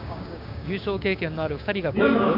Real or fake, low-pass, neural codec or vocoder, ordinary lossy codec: fake; 5.4 kHz; codec, 16 kHz, 6 kbps, DAC; none